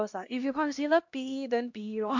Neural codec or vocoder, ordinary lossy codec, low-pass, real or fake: codec, 16 kHz, 1 kbps, X-Codec, HuBERT features, trained on LibriSpeech; MP3, 48 kbps; 7.2 kHz; fake